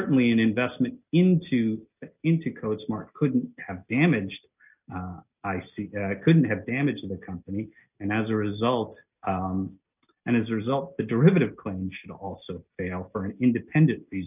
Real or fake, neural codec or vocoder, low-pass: real; none; 3.6 kHz